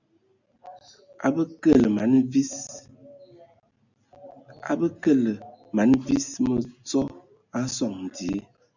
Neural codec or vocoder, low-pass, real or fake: none; 7.2 kHz; real